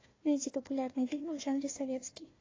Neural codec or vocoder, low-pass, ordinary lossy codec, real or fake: codec, 16 kHz, 1 kbps, FunCodec, trained on Chinese and English, 50 frames a second; 7.2 kHz; AAC, 32 kbps; fake